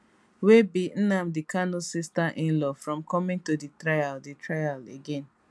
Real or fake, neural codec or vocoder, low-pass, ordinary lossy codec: real; none; none; none